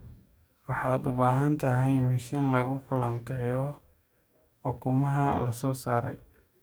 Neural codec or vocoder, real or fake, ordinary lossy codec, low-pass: codec, 44.1 kHz, 2.6 kbps, DAC; fake; none; none